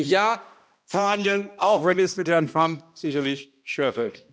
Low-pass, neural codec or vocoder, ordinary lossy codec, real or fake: none; codec, 16 kHz, 1 kbps, X-Codec, HuBERT features, trained on balanced general audio; none; fake